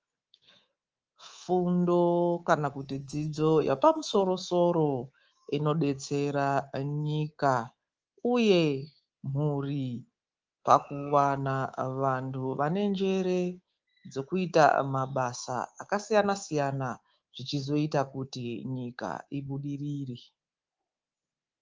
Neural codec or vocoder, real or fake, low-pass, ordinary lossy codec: codec, 24 kHz, 3.1 kbps, DualCodec; fake; 7.2 kHz; Opus, 24 kbps